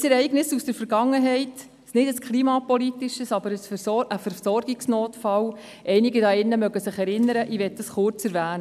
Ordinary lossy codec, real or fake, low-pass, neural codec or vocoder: none; real; 14.4 kHz; none